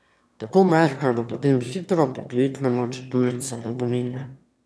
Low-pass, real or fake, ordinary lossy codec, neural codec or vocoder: none; fake; none; autoencoder, 22.05 kHz, a latent of 192 numbers a frame, VITS, trained on one speaker